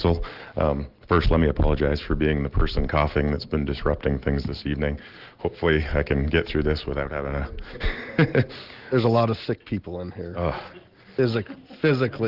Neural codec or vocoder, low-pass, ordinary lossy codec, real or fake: none; 5.4 kHz; Opus, 16 kbps; real